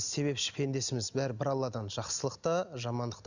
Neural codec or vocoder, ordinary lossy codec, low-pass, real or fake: none; none; 7.2 kHz; real